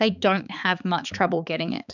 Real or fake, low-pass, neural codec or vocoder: fake; 7.2 kHz; codec, 16 kHz, 4 kbps, X-Codec, HuBERT features, trained on balanced general audio